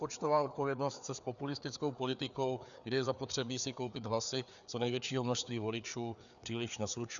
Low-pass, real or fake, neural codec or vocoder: 7.2 kHz; fake; codec, 16 kHz, 4 kbps, FreqCodec, larger model